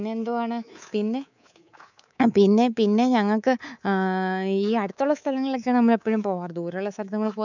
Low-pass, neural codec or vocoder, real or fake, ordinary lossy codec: 7.2 kHz; codec, 24 kHz, 3.1 kbps, DualCodec; fake; none